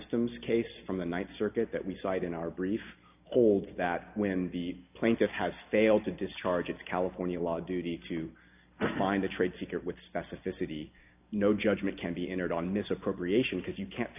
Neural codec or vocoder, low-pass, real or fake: none; 3.6 kHz; real